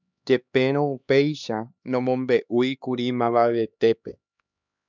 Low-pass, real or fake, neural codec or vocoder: 7.2 kHz; fake; codec, 16 kHz, 2 kbps, X-Codec, HuBERT features, trained on LibriSpeech